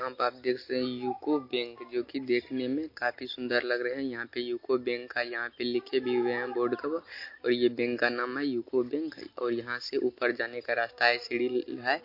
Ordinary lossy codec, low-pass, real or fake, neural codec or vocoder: MP3, 32 kbps; 5.4 kHz; real; none